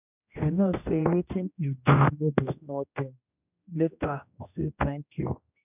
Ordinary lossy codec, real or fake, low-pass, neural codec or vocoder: none; fake; 3.6 kHz; codec, 16 kHz, 1 kbps, X-Codec, HuBERT features, trained on general audio